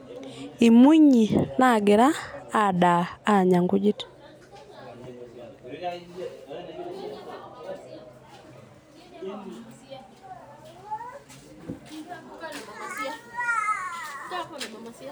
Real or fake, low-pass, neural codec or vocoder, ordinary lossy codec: real; none; none; none